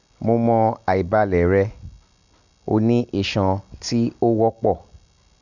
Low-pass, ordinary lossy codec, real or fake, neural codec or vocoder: 7.2 kHz; none; real; none